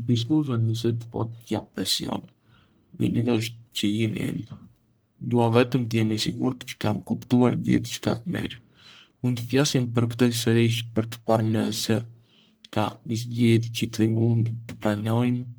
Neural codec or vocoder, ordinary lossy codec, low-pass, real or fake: codec, 44.1 kHz, 1.7 kbps, Pupu-Codec; none; none; fake